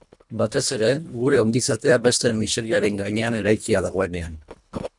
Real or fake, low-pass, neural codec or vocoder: fake; 10.8 kHz; codec, 24 kHz, 1.5 kbps, HILCodec